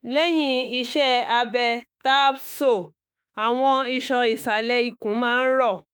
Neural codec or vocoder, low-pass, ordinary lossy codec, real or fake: autoencoder, 48 kHz, 32 numbers a frame, DAC-VAE, trained on Japanese speech; none; none; fake